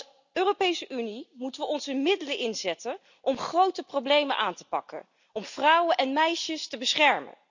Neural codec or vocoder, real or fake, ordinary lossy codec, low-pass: none; real; none; 7.2 kHz